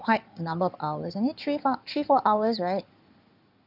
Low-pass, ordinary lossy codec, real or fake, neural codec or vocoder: 5.4 kHz; none; fake; codec, 16 kHz in and 24 kHz out, 2.2 kbps, FireRedTTS-2 codec